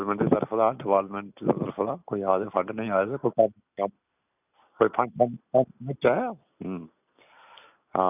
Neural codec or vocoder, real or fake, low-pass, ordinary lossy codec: none; real; 3.6 kHz; none